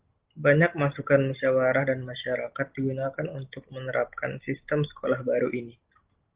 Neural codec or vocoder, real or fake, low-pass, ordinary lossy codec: none; real; 3.6 kHz; Opus, 16 kbps